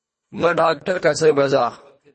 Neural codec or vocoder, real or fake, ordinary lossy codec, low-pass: codec, 24 kHz, 1.5 kbps, HILCodec; fake; MP3, 32 kbps; 10.8 kHz